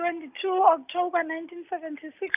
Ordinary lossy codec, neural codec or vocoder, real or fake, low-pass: none; none; real; 3.6 kHz